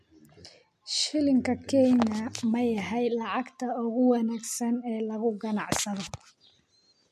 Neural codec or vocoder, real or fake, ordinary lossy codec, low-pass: none; real; MP3, 64 kbps; 14.4 kHz